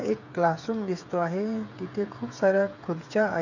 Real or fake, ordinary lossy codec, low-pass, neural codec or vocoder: fake; none; 7.2 kHz; codec, 16 kHz, 8 kbps, FreqCodec, smaller model